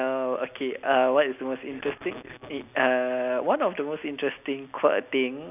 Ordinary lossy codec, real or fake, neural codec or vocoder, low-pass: none; real; none; 3.6 kHz